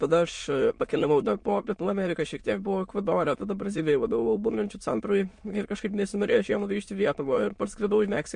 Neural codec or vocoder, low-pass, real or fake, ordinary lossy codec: autoencoder, 22.05 kHz, a latent of 192 numbers a frame, VITS, trained on many speakers; 9.9 kHz; fake; MP3, 48 kbps